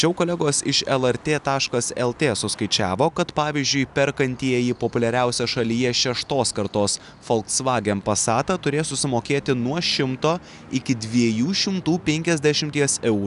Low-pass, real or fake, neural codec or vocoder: 10.8 kHz; real; none